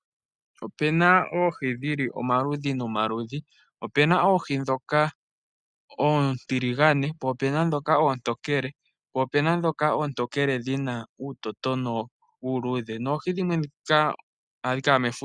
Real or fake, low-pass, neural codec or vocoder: real; 9.9 kHz; none